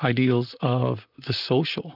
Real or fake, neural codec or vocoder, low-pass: fake; vocoder, 44.1 kHz, 128 mel bands, Pupu-Vocoder; 5.4 kHz